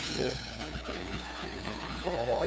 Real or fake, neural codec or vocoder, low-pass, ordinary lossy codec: fake; codec, 16 kHz, 2 kbps, FunCodec, trained on LibriTTS, 25 frames a second; none; none